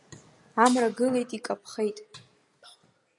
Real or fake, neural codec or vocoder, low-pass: real; none; 10.8 kHz